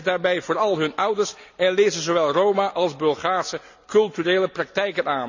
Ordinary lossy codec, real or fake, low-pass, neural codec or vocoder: none; real; 7.2 kHz; none